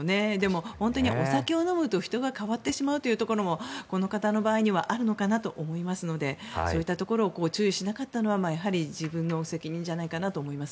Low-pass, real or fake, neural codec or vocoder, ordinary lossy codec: none; real; none; none